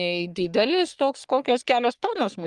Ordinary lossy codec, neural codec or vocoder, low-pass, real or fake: Opus, 32 kbps; codec, 44.1 kHz, 3.4 kbps, Pupu-Codec; 10.8 kHz; fake